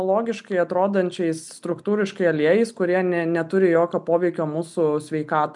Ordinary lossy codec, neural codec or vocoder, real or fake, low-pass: MP3, 96 kbps; none; real; 10.8 kHz